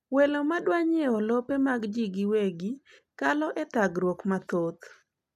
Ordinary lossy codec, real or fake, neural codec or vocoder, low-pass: none; real; none; 14.4 kHz